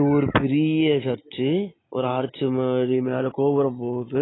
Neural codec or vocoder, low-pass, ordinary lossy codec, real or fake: none; 7.2 kHz; AAC, 16 kbps; real